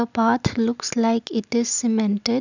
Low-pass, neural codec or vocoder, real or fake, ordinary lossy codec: 7.2 kHz; none; real; none